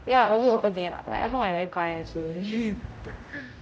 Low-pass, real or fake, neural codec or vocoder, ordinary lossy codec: none; fake; codec, 16 kHz, 0.5 kbps, X-Codec, HuBERT features, trained on general audio; none